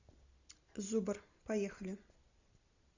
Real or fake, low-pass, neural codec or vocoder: real; 7.2 kHz; none